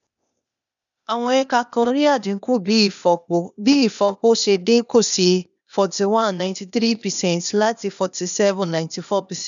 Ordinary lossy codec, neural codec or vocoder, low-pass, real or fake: none; codec, 16 kHz, 0.8 kbps, ZipCodec; 7.2 kHz; fake